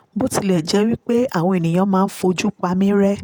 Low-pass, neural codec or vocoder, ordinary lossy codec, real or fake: none; vocoder, 48 kHz, 128 mel bands, Vocos; none; fake